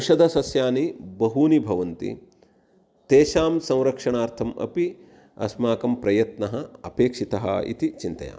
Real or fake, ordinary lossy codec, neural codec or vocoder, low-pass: real; none; none; none